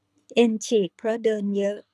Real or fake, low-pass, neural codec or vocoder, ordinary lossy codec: fake; none; codec, 24 kHz, 6 kbps, HILCodec; none